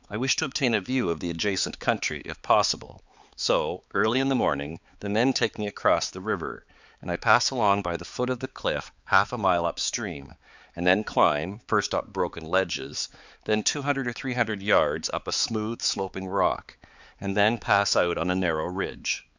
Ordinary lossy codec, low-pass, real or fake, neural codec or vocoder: Opus, 64 kbps; 7.2 kHz; fake; codec, 16 kHz, 4 kbps, X-Codec, HuBERT features, trained on balanced general audio